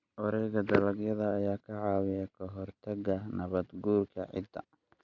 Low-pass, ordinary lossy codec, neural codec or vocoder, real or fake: 7.2 kHz; none; none; real